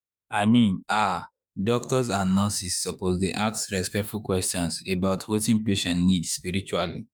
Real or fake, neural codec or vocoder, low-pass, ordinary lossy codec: fake; autoencoder, 48 kHz, 32 numbers a frame, DAC-VAE, trained on Japanese speech; none; none